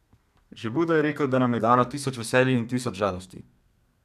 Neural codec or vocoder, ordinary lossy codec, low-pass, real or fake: codec, 32 kHz, 1.9 kbps, SNAC; none; 14.4 kHz; fake